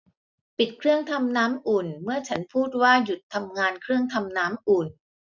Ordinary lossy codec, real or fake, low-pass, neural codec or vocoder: none; real; 7.2 kHz; none